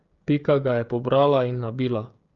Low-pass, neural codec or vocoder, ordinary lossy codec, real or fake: 7.2 kHz; codec, 16 kHz, 16 kbps, FreqCodec, smaller model; Opus, 32 kbps; fake